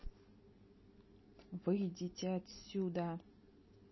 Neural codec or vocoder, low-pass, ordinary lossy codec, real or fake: none; 7.2 kHz; MP3, 24 kbps; real